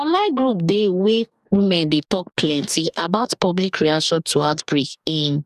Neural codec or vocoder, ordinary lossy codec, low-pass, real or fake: codec, 44.1 kHz, 2.6 kbps, DAC; none; 14.4 kHz; fake